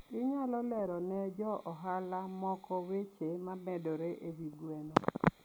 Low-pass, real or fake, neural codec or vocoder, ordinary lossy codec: none; real; none; none